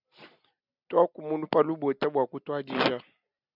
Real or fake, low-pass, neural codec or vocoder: real; 5.4 kHz; none